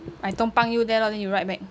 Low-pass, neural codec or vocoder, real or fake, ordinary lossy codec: none; none; real; none